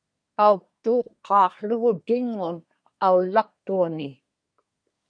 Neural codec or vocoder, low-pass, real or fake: codec, 24 kHz, 1 kbps, SNAC; 9.9 kHz; fake